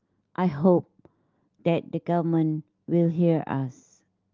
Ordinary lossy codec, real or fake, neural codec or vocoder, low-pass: Opus, 32 kbps; real; none; 7.2 kHz